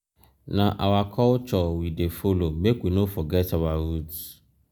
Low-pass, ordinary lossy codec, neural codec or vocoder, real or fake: none; none; none; real